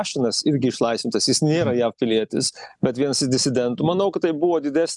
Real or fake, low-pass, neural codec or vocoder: real; 10.8 kHz; none